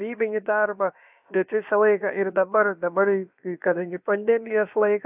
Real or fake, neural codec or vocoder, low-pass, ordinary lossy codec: fake; codec, 16 kHz, about 1 kbps, DyCAST, with the encoder's durations; 3.6 kHz; AAC, 32 kbps